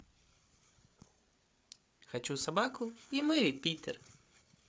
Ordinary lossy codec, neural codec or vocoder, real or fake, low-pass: none; codec, 16 kHz, 8 kbps, FreqCodec, larger model; fake; none